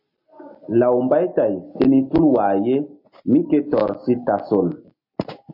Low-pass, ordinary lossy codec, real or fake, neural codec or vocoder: 5.4 kHz; MP3, 32 kbps; real; none